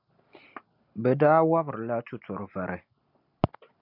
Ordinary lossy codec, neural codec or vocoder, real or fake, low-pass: MP3, 48 kbps; none; real; 5.4 kHz